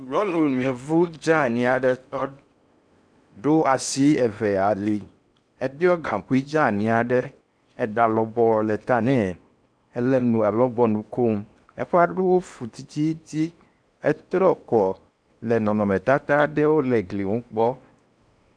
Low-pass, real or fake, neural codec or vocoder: 9.9 kHz; fake; codec, 16 kHz in and 24 kHz out, 0.8 kbps, FocalCodec, streaming, 65536 codes